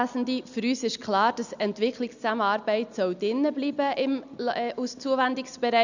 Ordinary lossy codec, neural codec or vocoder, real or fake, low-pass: none; none; real; 7.2 kHz